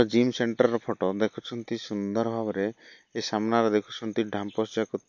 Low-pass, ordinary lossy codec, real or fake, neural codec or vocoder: 7.2 kHz; MP3, 48 kbps; real; none